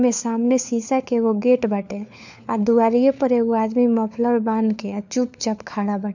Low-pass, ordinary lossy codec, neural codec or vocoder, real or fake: 7.2 kHz; none; codec, 16 kHz, 4 kbps, FunCodec, trained on LibriTTS, 50 frames a second; fake